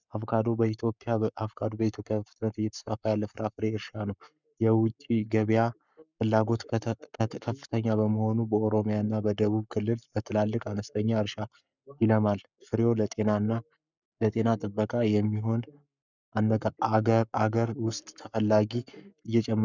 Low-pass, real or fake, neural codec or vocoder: 7.2 kHz; fake; codec, 44.1 kHz, 7.8 kbps, Pupu-Codec